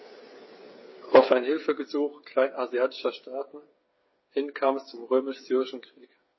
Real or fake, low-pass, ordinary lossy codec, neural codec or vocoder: fake; 7.2 kHz; MP3, 24 kbps; codec, 16 kHz, 8 kbps, FreqCodec, smaller model